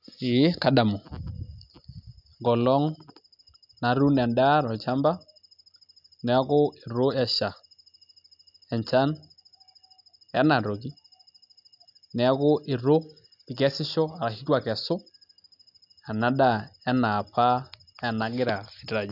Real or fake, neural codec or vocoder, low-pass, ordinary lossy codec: real; none; 5.4 kHz; none